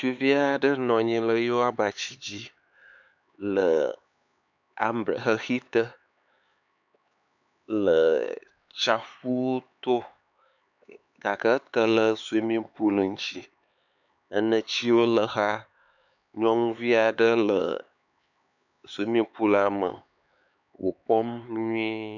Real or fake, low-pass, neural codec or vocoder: fake; 7.2 kHz; codec, 16 kHz, 4 kbps, X-Codec, HuBERT features, trained on LibriSpeech